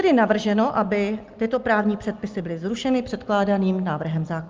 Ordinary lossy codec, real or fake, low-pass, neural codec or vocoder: Opus, 32 kbps; real; 7.2 kHz; none